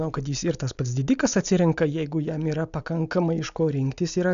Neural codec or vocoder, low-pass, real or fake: none; 7.2 kHz; real